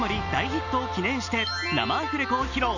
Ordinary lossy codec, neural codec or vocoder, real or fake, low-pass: none; none; real; 7.2 kHz